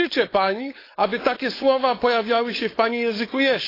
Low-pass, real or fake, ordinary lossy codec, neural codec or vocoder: 5.4 kHz; fake; AAC, 24 kbps; codec, 16 kHz, 4.8 kbps, FACodec